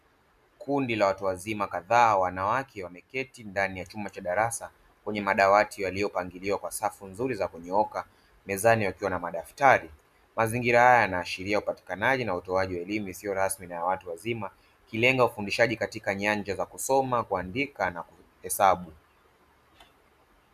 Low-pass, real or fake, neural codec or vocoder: 14.4 kHz; real; none